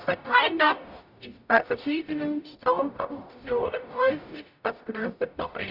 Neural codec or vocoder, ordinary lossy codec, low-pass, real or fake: codec, 44.1 kHz, 0.9 kbps, DAC; none; 5.4 kHz; fake